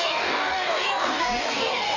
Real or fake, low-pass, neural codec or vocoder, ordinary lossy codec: fake; 7.2 kHz; codec, 44.1 kHz, 2.6 kbps, DAC; none